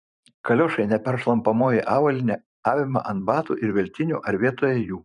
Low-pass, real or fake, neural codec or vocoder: 10.8 kHz; fake; vocoder, 44.1 kHz, 128 mel bands every 512 samples, BigVGAN v2